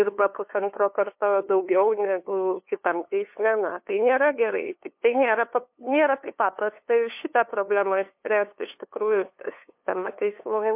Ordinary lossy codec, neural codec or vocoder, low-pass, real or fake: MP3, 32 kbps; codec, 16 kHz, 2 kbps, FunCodec, trained on LibriTTS, 25 frames a second; 3.6 kHz; fake